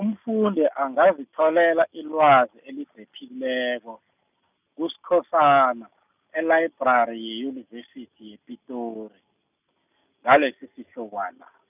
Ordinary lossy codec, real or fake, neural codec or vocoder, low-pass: none; real; none; 3.6 kHz